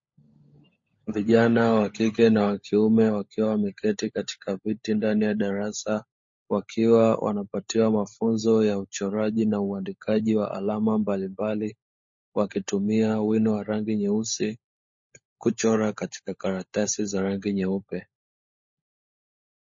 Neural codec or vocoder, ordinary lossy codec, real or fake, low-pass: codec, 16 kHz, 16 kbps, FunCodec, trained on LibriTTS, 50 frames a second; MP3, 32 kbps; fake; 7.2 kHz